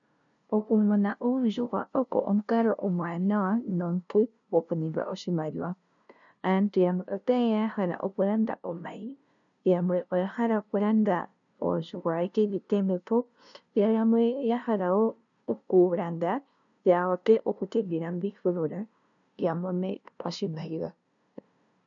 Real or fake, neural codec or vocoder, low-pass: fake; codec, 16 kHz, 0.5 kbps, FunCodec, trained on LibriTTS, 25 frames a second; 7.2 kHz